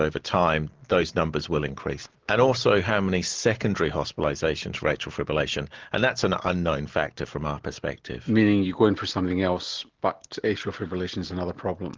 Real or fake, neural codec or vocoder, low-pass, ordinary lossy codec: real; none; 7.2 kHz; Opus, 16 kbps